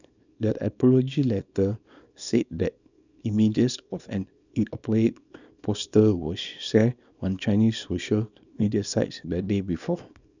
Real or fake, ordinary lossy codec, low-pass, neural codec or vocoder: fake; none; 7.2 kHz; codec, 24 kHz, 0.9 kbps, WavTokenizer, small release